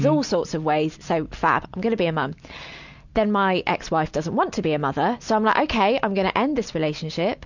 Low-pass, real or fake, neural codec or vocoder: 7.2 kHz; real; none